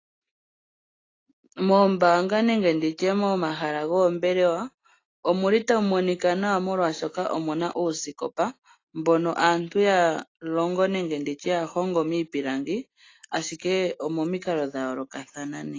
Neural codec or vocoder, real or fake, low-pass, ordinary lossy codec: none; real; 7.2 kHz; AAC, 32 kbps